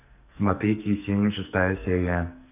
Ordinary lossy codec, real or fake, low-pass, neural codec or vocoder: none; fake; 3.6 kHz; codec, 44.1 kHz, 2.6 kbps, SNAC